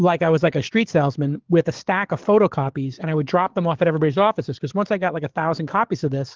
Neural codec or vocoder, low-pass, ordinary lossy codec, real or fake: codec, 44.1 kHz, 7.8 kbps, DAC; 7.2 kHz; Opus, 16 kbps; fake